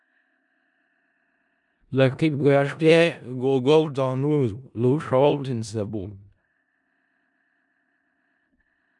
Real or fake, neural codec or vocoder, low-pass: fake; codec, 16 kHz in and 24 kHz out, 0.4 kbps, LongCat-Audio-Codec, four codebook decoder; 10.8 kHz